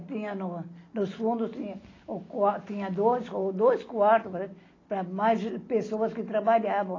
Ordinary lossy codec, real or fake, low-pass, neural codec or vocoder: AAC, 32 kbps; real; 7.2 kHz; none